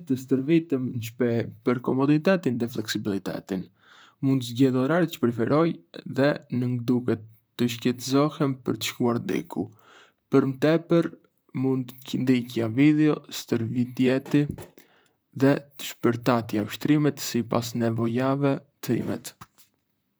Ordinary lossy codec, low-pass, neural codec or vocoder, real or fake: none; none; vocoder, 44.1 kHz, 128 mel bands, Pupu-Vocoder; fake